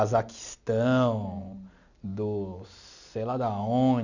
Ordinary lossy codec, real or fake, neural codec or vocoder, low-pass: none; real; none; 7.2 kHz